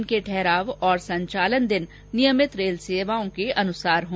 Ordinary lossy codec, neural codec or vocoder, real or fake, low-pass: none; none; real; none